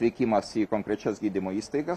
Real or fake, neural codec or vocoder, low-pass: real; none; 14.4 kHz